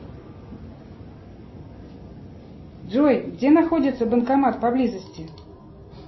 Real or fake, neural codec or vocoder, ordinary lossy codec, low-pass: real; none; MP3, 24 kbps; 7.2 kHz